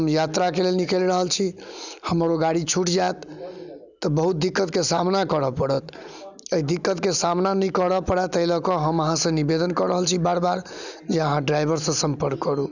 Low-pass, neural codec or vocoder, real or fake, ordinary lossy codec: 7.2 kHz; none; real; none